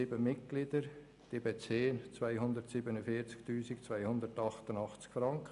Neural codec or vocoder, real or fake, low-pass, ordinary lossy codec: none; real; 10.8 kHz; none